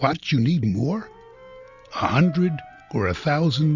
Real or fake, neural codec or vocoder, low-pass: real; none; 7.2 kHz